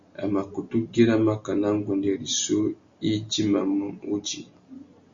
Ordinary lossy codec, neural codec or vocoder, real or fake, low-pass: Opus, 64 kbps; none; real; 7.2 kHz